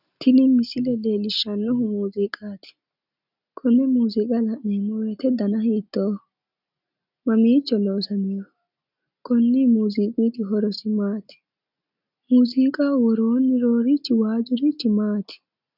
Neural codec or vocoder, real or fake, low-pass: none; real; 5.4 kHz